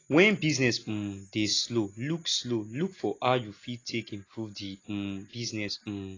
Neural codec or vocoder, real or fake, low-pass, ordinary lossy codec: none; real; 7.2 kHz; AAC, 32 kbps